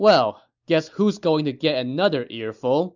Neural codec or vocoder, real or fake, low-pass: none; real; 7.2 kHz